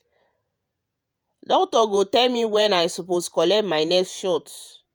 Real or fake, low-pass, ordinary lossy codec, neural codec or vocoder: fake; none; none; vocoder, 48 kHz, 128 mel bands, Vocos